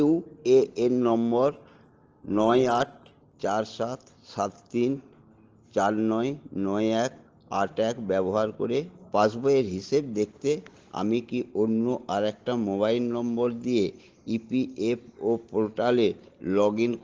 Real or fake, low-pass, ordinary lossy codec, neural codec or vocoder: real; 7.2 kHz; Opus, 16 kbps; none